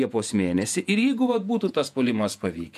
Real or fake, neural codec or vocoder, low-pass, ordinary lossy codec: fake; vocoder, 48 kHz, 128 mel bands, Vocos; 14.4 kHz; MP3, 96 kbps